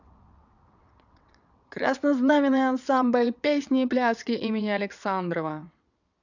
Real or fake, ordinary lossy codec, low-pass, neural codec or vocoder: fake; none; 7.2 kHz; vocoder, 22.05 kHz, 80 mel bands, WaveNeXt